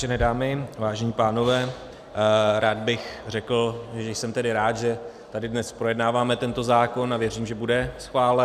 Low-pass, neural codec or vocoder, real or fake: 14.4 kHz; none; real